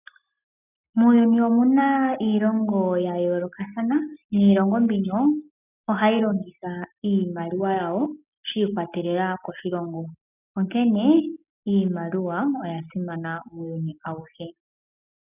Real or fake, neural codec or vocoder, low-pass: real; none; 3.6 kHz